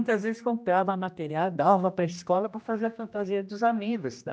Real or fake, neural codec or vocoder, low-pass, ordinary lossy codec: fake; codec, 16 kHz, 1 kbps, X-Codec, HuBERT features, trained on general audio; none; none